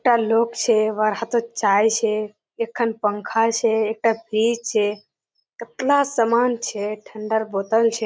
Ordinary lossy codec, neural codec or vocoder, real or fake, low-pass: none; none; real; none